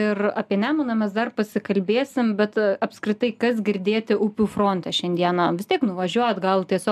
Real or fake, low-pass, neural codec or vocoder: real; 14.4 kHz; none